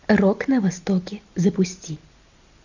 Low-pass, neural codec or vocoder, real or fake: 7.2 kHz; none; real